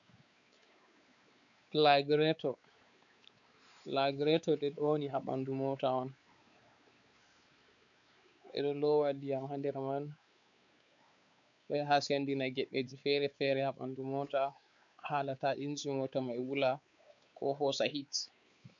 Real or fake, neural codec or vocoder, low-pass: fake; codec, 16 kHz, 4 kbps, X-Codec, WavLM features, trained on Multilingual LibriSpeech; 7.2 kHz